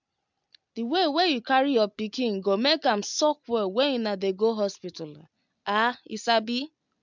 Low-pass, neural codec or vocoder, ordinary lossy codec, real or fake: 7.2 kHz; none; MP3, 64 kbps; real